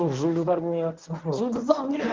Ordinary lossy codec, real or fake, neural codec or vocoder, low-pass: Opus, 32 kbps; fake; codec, 24 kHz, 0.9 kbps, WavTokenizer, medium speech release version 1; 7.2 kHz